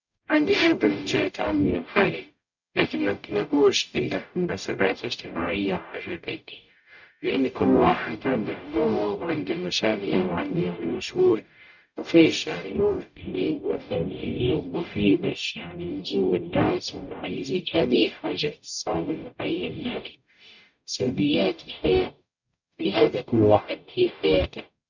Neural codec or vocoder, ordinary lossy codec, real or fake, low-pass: codec, 44.1 kHz, 0.9 kbps, DAC; none; fake; 7.2 kHz